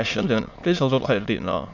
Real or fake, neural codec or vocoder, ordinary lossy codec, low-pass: fake; autoencoder, 22.05 kHz, a latent of 192 numbers a frame, VITS, trained on many speakers; none; 7.2 kHz